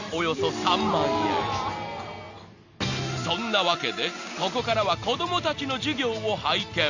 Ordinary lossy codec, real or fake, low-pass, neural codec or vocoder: Opus, 64 kbps; real; 7.2 kHz; none